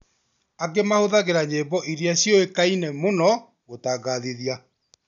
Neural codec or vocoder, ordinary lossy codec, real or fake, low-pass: none; none; real; 7.2 kHz